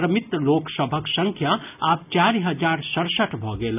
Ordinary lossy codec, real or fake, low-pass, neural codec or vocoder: none; real; 3.6 kHz; none